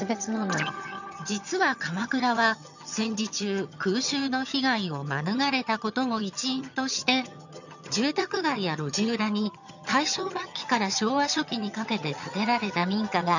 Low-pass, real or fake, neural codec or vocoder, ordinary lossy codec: 7.2 kHz; fake; vocoder, 22.05 kHz, 80 mel bands, HiFi-GAN; none